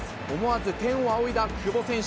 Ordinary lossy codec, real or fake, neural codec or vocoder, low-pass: none; real; none; none